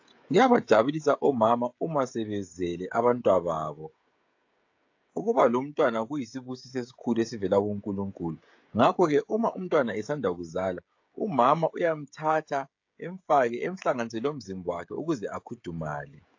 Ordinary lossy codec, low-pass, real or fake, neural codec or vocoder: AAC, 48 kbps; 7.2 kHz; fake; codec, 16 kHz, 16 kbps, FreqCodec, smaller model